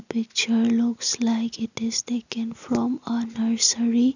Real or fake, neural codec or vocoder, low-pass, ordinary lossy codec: real; none; 7.2 kHz; none